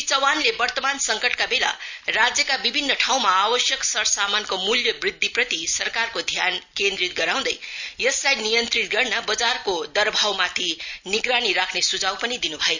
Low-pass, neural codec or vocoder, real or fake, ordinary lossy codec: 7.2 kHz; none; real; none